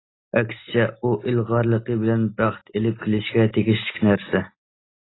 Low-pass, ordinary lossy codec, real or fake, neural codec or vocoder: 7.2 kHz; AAC, 16 kbps; real; none